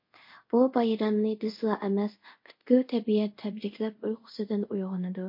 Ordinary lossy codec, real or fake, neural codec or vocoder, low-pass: MP3, 32 kbps; fake; codec, 24 kHz, 0.5 kbps, DualCodec; 5.4 kHz